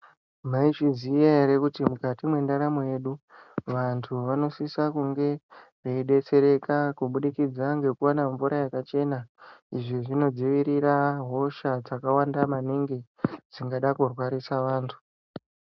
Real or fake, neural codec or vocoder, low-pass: real; none; 7.2 kHz